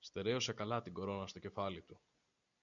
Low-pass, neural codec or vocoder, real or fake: 7.2 kHz; none; real